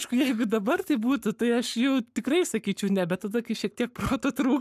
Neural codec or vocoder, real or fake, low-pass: codec, 44.1 kHz, 7.8 kbps, Pupu-Codec; fake; 14.4 kHz